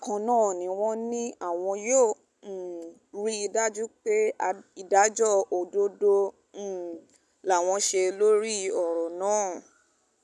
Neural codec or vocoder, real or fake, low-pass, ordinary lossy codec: none; real; none; none